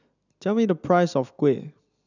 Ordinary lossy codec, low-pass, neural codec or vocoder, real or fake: none; 7.2 kHz; none; real